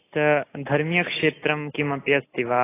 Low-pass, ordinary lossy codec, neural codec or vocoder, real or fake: 3.6 kHz; AAC, 16 kbps; none; real